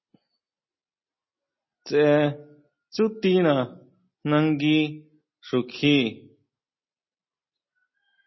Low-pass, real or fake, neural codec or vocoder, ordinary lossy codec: 7.2 kHz; real; none; MP3, 24 kbps